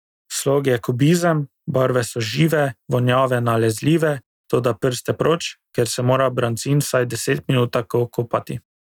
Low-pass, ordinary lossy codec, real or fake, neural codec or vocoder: 19.8 kHz; none; real; none